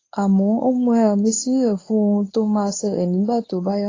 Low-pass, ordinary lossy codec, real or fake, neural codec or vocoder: 7.2 kHz; AAC, 32 kbps; fake; codec, 24 kHz, 0.9 kbps, WavTokenizer, medium speech release version 1